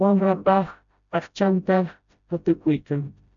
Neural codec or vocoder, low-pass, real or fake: codec, 16 kHz, 0.5 kbps, FreqCodec, smaller model; 7.2 kHz; fake